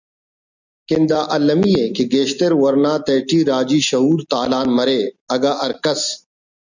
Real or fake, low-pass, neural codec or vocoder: real; 7.2 kHz; none